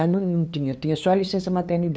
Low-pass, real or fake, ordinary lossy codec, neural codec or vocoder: none; fake; none; codec, 16 kHz, 2 kbps, FunCodec, trained on LibriTTS, 25 frames a second